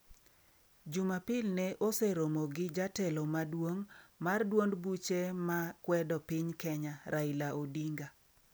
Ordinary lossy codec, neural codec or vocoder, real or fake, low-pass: none; none; real; none